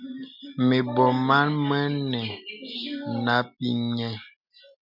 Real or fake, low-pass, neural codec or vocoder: real; 5.4 kHz; none